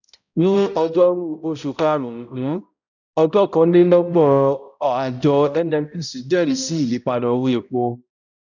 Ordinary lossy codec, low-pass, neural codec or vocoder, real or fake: none; 7.2 kHz; codec, 16 kHz, 0.5 kbps, X-Codec, HuBERT features, trained on balanced general audio; fake